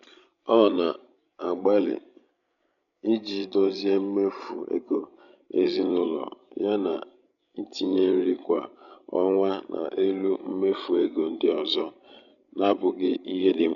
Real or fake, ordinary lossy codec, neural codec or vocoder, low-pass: fake; none; codec, 16 kHz, 16 kbps, FreqCodec, larger model; 7.2 kHz